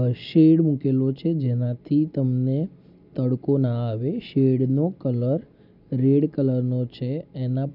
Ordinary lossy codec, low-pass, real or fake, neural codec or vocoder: none; 5.4 kHz; real; none